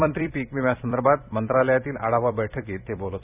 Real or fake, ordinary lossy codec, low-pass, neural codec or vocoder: fake; none; 3.6 kHz; vocoder, 44.1 kHz, 128 mel bands every 256 samples, BigVGAN v2